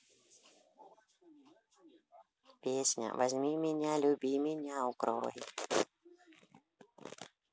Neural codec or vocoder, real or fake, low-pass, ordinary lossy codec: none; real; none; none